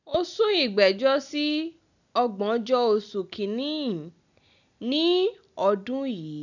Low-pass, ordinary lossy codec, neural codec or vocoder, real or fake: 7.2 kHz; none; none; real